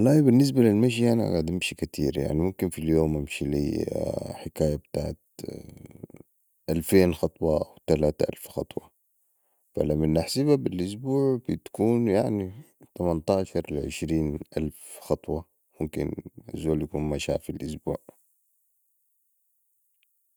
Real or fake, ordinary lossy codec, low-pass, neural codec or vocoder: real; none; none; none